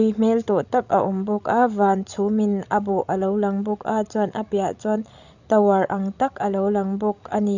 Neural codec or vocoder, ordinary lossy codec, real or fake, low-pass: codec, 16 kHz, 16 kbps, FreqCodec, larger model; none; fake; 7.2 kHz